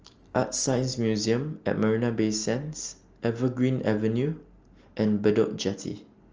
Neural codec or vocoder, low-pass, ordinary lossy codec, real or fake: none; 7.2 kHz; Opus, 24 kbps; real